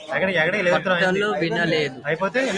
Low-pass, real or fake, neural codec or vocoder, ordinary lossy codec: 9.9 kHz; real; none; Opus, 64 kbps